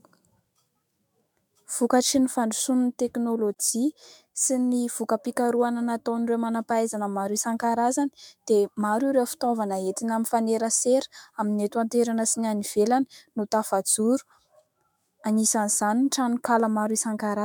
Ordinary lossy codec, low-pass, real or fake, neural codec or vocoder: MP3, 96 kbps; 19.8 kHz; fake; autoencoder, 48 kHz, 128 numbers a frame, DAC-VAE, trained on Japanese speech